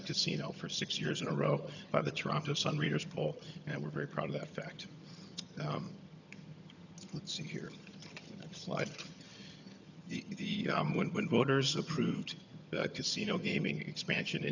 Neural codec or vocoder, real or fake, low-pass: vocoder, 22.05 kHz, 80 mel bands, HiFi-GAN; fake; 7.2 kHz